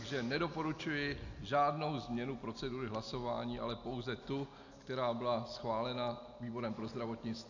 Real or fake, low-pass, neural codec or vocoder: real; 7.2 kHz; none